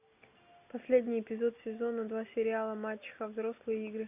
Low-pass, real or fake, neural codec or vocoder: 3.6 kHz; real; none